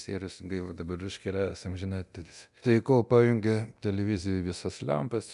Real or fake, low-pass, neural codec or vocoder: fake; 10.8 kHz; codec, 24 kHz, 0.9 kbps, DualCodec